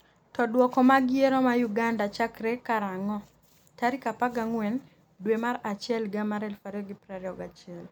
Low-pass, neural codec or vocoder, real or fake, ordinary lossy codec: none; none; real; none